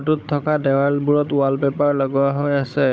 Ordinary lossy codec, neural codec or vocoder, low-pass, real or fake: none; codec, 16 kHz, 16 kbps, FunCodec, trained on Chinese and English, 50 frames a second; none; fake